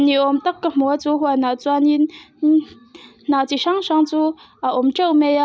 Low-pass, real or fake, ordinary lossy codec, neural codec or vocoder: none; real; none; none